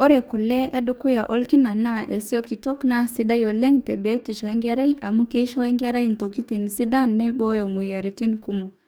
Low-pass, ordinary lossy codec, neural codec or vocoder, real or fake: none; none; codec, 44.1 kHz, 2.6 kbps, DAC; fake